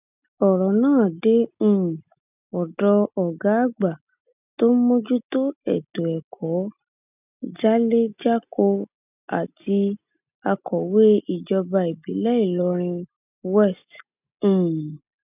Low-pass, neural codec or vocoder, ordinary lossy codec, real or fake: 3.6 kHz; none; none; real